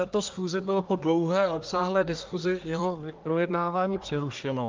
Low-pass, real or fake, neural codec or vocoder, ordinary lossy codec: 7.2 kHz; fake; codec, 24 kHz, 1 kbps, SNAC; Opus, 32 kbps